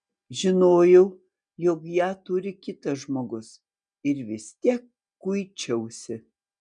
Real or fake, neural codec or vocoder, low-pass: real; none; 9.9 kHz